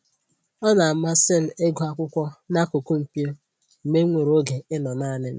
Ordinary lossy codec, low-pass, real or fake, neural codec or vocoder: none; none; real; none